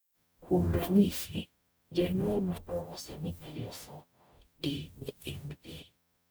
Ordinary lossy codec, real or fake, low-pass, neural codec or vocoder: none; fake; none; codec, 44.1 kHz, 0.9 kbps, DAC